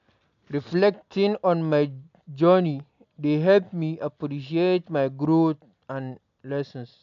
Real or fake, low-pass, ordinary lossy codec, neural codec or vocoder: real; 7.2 kHz; AAC, 64 kbps; none